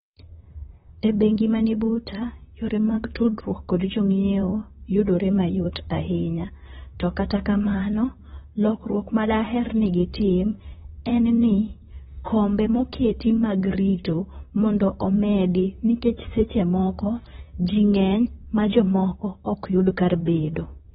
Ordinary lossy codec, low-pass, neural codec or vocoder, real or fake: AAC, 16 kbps; 19.8 kHz; vocoder, 44.1 kHz, 128 mel bands, Pupu-Vocoder; fake